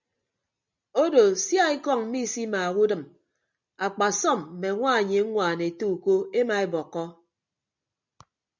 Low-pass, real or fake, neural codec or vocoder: 7.2 kHz; real; none